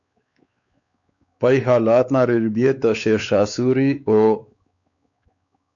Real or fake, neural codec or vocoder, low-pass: fake; codec, 16 kHz, 4 kbps, X-Codec, WavLM features, trained on Multilingual LibriSpeech; 7.2 kHz